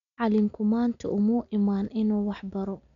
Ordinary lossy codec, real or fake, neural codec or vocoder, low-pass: none; real; none; 7.2 kHz